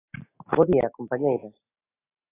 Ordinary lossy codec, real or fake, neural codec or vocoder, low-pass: AAC, 16 kbps; real; none; 3.6 kHz